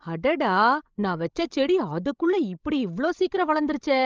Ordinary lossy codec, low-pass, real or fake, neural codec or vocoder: Opus, 24 kbps; 7.2 kHz; fake; codec, 16 kHz, 16 kbps, FreqCodec, larger model